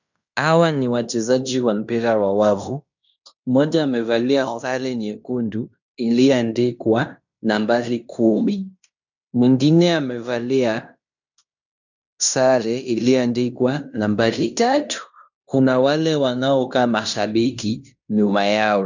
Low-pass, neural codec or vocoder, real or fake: 7.2 kHz; codec, 16 kHz in and 24 kHz out, 0.9 kbps, LongCat-Audio-Codec, fine tuned four codebook decoder; fake